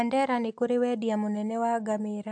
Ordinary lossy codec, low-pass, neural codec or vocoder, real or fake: AAC, 64 kbps; 9.9 kHz; none; real